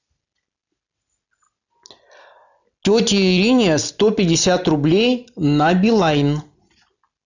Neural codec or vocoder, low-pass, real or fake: none; 7.2 kHz; real